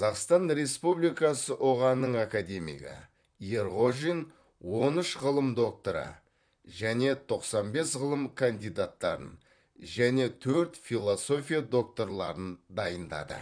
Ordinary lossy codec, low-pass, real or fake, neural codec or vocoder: none; 9.9 kHz; fake; vocoder, 44.1 kHz, 128 mel bands, Pupu-Vocoder